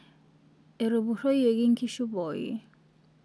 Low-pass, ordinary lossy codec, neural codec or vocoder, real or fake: none; none; none; real